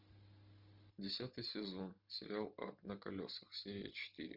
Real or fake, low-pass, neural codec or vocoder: real; 5.4 kHz; none